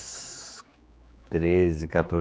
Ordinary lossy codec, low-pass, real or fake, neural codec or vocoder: none; none; fake; codec, 16 kHz, 2 kbps, X-Codec, HuBERT features, trained on general audio